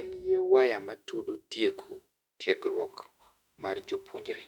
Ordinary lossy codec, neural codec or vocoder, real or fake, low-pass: none; autoencoder, 48 kHz, 32 numbers a frame, DAC-VAE, trained on Japanese speech; fake; 19.8 kHz